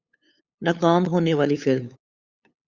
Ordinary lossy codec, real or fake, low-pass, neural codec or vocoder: Opus, 64 kbps; fake; 7.2 kHz; codec, 16 kHz, 8 kbps, FunCodec, trained on LibriTTS, 25 frames a second